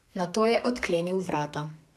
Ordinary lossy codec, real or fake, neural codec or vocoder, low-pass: AAC, 96 kbps; fake; codec, 32 kHz, 1.9 kbps, SNAC; 14.4 kHz